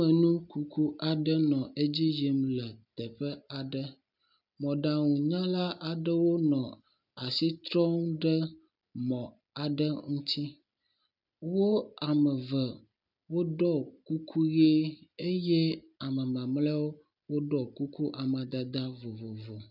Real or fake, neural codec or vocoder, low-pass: real; none; 5.4 kHz